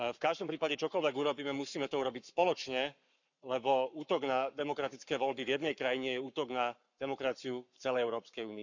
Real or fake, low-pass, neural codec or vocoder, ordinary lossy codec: fake; 7.2 kHz; codec, 44.1 kHz, 7.8 kbps, Pupu-Codec; none